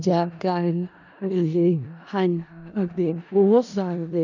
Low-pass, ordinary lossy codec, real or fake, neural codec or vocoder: 7.2 kHz; none; fake; codec, 16 kHz in and 24 kHz out, 0.4 kbps, LongCat-Audio-Codec, four codebook decoder